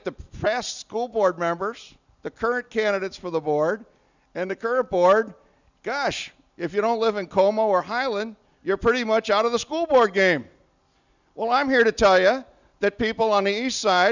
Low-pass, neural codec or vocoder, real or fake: 7.2 kHz; none; real